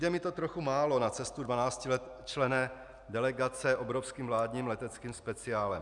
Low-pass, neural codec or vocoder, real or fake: 10.8 kHz; none; real